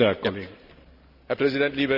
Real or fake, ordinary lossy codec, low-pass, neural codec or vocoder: real; none; 5.4 kHz; none